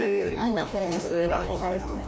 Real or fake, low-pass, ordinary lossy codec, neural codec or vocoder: fake; none; none; codec, 16 kHz, 1 kbps, FreqCodec, larger model